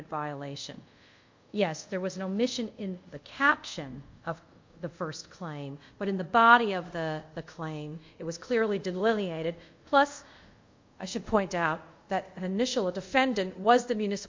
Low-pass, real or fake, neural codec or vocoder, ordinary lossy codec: 7.2 kHz; fake; codec, 24 kHz, 0.5 kbps, DualCodec; MP3, 48 kbps